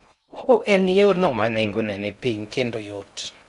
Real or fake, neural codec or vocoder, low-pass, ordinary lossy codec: fake; codec, 16 kHz in and 24 kHz out, 0.6 kbps, FocalCodec, streaming, 2048 codes; 10.8 kHz; none